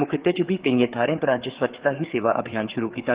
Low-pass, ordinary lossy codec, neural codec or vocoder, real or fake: 3.6 kHz; Opus, 16 kbps; codec, 16 kHz, 4 kbps, FreqCodec, larger model; fake